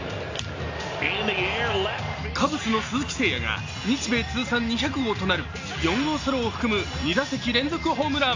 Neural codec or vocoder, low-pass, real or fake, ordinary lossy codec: none; 7.2 kHz; real; AAC, 48 kbps